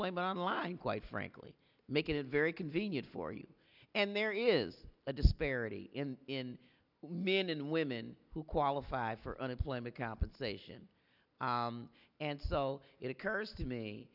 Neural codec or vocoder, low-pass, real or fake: none; 5.4 kHz; real